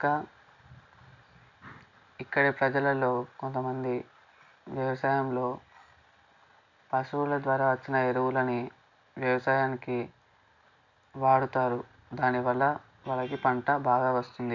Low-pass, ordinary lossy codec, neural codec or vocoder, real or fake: 7.2 kHz; none; none; real